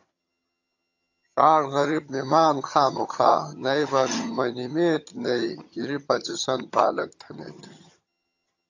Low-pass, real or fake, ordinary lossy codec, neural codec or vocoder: 7.2 kHz; fake; AAC, 48 kbps; vocoder, 22.05 kHz, 80 mel bands, HiFi-GAN